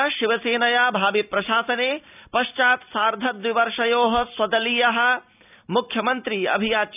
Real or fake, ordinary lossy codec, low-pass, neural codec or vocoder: real; none; 3.6 kHz; none